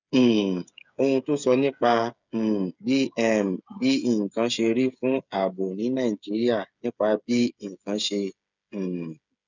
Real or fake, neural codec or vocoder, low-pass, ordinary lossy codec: fake; codec, 16 kHz, 8 kbps, FreqCodec, smaller model; 7.2 kHz; none